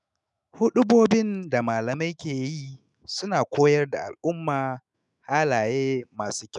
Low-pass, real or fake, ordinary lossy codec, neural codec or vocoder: 10.8 kHz; fake; none; autoencoder, 48 kHz, 128 numbers a frame, DAC-VAE, trained on Japanese speech